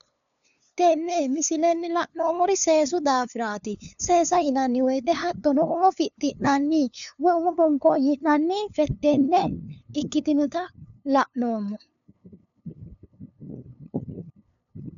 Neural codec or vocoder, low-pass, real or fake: codec, 16 kHz, 2 kbps, FunCodec, trained on LibriTTS, 25 frames a second; 7.2 kHz; fake